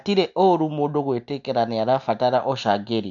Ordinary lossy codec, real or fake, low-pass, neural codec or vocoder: none; real; 7.2 kHz; none